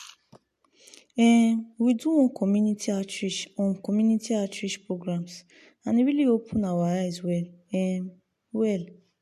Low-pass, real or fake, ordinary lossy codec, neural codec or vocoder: 14.4 kHz; real; MP3, 64 kbps; none